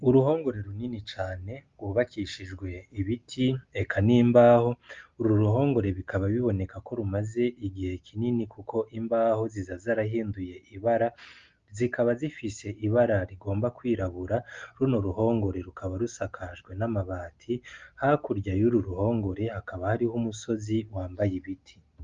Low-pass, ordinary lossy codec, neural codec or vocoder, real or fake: 7.2 kHz; Opus, 32 kbps; none; real